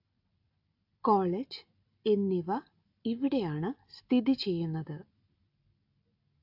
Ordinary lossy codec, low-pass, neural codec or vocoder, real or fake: AAC, 48 kbps; 5.4 kHz; none; real